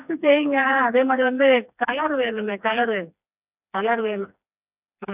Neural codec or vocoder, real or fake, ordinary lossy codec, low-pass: codec, 16 kHz, 2 kbps, FreqCodec, smaller model; fake; none; 3.6 kHz